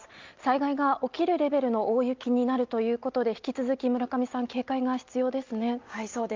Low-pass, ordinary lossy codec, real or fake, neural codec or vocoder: 7.2 kHz; Opus, 24 kbps; real; none